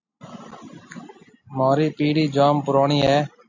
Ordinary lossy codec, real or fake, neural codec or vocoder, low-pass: AAC, 48 kbps; real; none; 7.2 kHz